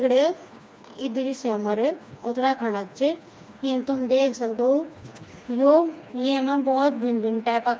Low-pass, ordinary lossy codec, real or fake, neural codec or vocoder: none; none; fake; codec, 16 kHz, 2 kbps, FreqCodec, smaller model